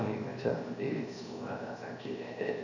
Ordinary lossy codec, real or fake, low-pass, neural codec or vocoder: none; fake; 7.2 kHz; codec, 16 kHz, 0.7 kbps, FocalCodec